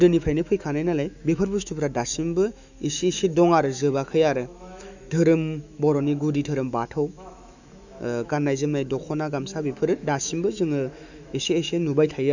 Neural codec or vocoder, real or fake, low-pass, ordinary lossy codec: autoencoder, 48 kHz, 128 numbers a frame, DAC-VAE, trained on Japanese speech; fake; 7.2 kHz; none